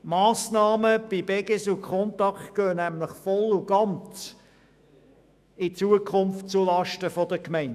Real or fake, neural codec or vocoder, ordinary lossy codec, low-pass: fake; autoencoder, 48 kHz, 128 numbers a frame, DAC-VAE, trained on Japanese speech; none; 14.4 kHz